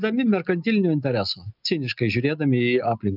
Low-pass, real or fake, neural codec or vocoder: 5.4 kHz; real; none